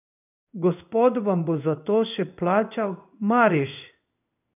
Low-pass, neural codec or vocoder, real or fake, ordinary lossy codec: 3.6 kHz; codec, 16 kHz in and 24 kHz out, 1 kbps, XY-Tokenizer; fake; none